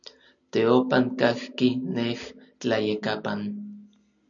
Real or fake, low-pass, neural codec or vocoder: real; 7.2 kHz; none